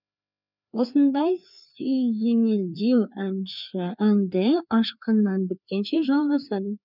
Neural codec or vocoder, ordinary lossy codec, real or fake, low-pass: codec, 16 kHz, 2 kbps, FreqCodec, larger model; none; fake; 5.4 kHz